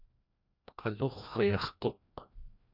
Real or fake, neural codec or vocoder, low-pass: fake; codec, 16 kHz, 1 kbps, FreqCodec, larger model; 5.4 kHz